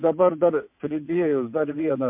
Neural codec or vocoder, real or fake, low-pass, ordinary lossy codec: vocoder, 22.05 kHz, 80 mel bands, WaveNeXt; fake; 3.6 kHz; MP3, 32 kbps